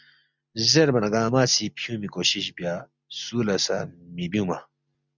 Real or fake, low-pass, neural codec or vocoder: real; 7.2 kHz; none